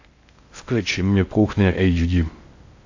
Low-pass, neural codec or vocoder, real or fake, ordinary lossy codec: 7.2 kHz; codec, 16 kHz in and 24 kHz out, 0.6 kbps, FocalCodec, streaming, 2048 codes; fake; AAC, 48 kbps